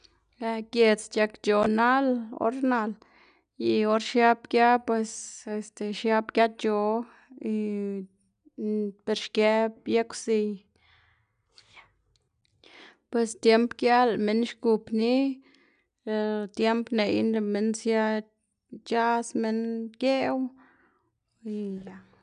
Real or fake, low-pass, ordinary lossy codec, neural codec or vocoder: real; 9.9 kHz; none; none